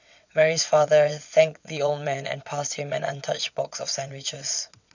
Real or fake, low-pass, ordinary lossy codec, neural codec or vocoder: fake; 7.2 kHz; none; vocoder, 22.05 kHz, 80 mel bands, WaveNeXt